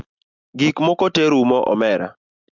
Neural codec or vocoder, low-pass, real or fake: none; 7.2 kHz; real